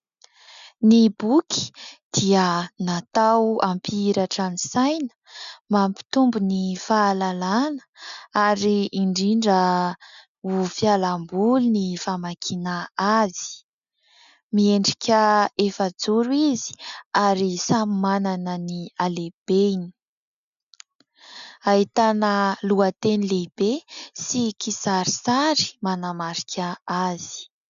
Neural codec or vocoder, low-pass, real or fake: none; 7.2 kHz; real